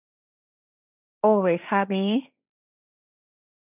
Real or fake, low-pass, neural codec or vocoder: fake; 3.6 kHz; codec, 16 kHz, 1.1 kbps, Voila-Tokenizer